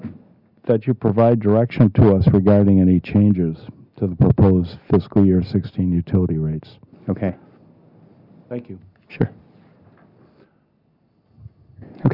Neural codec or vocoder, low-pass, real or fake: none; 5.4 kHz; real